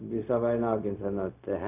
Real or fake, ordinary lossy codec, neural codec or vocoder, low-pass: fake; none; codec, 16 kHz, 0.4 kbps, LongCat-Audio-Codec; 3.6 kHz